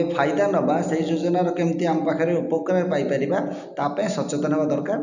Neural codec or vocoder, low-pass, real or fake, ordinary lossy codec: none; 7.2 kHz; real; none